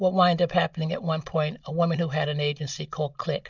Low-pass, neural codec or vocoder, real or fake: 7.2 kHz; none; real